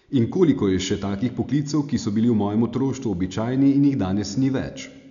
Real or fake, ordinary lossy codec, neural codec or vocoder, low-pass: real; none; none; 7.2 kHz